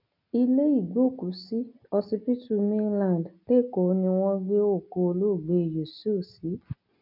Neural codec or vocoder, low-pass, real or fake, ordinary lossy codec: none; 5.4 kHz; real; none